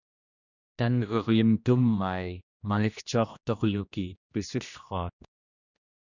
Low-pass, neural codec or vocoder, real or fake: 7.2 kHz; codec, 16 kHz, 1 kbps, X-Codec, HuBERT features, trained on general audio; fake